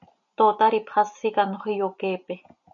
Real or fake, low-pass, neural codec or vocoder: real; 7.2 kHz; none